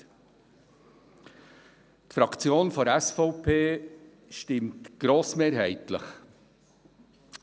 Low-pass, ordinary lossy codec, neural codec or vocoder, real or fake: none; none; none; real